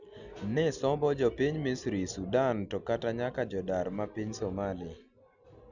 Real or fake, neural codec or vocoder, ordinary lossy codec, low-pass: real; none; none; 7.2 kHz